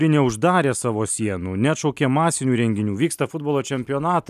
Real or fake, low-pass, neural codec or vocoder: real; 14.4 kHz; none